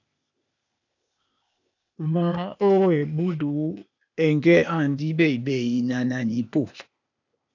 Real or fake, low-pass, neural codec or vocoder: fake; 7.2 kHz; codec, 16 kHz, 0.8 kbps, ZipCodec